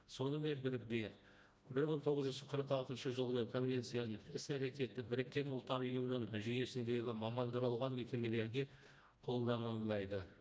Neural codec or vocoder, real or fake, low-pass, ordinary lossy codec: codec, 16 kHz, 1 kbps, FreqCodec, smaller model; fake; none; none